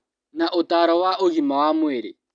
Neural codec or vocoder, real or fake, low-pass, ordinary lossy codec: none; real; 9.9 kHz; none